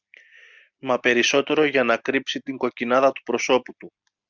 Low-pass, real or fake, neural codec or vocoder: 7.2 kHz; real; none